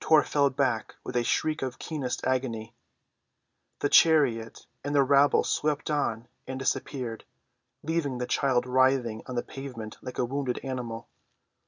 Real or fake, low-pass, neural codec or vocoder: real; 7.2 kHz; none